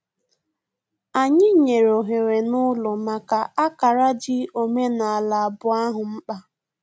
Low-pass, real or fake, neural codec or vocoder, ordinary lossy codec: none; real; none; none